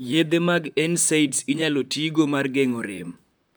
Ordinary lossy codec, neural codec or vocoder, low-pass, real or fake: none; vocoder, 44.1 kHz, 128 mel bands, Pupu-Vocoder; none; fake